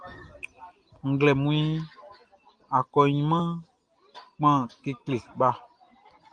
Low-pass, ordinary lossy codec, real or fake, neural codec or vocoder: 9.9 kHz; Opus, 24 kbps; real; none